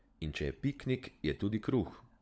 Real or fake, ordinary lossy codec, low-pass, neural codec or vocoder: fake; none; none; codec, 16 kHz, 16 kbps, FunCodec, trained on LibriTTS, 50 frames a second